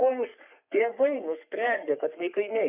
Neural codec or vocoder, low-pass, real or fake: codec, 44.1 kHz, 3.4 kbps, Pupu-Codec; 3.6 kHz; fake